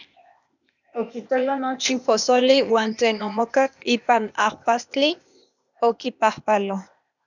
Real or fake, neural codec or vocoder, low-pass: fake; codec, 16 kHz, 0.8 kbps, ZipCodec; 7.2 kHz